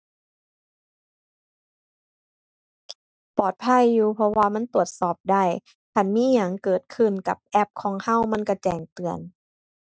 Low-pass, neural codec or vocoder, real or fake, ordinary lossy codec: none; none; real; none